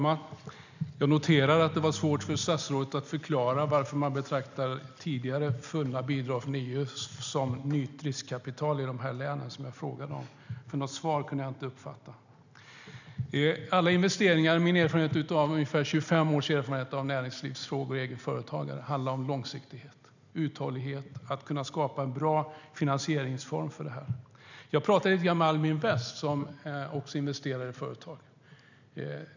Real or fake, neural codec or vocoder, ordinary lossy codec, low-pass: real; none; none; 7.2 kHz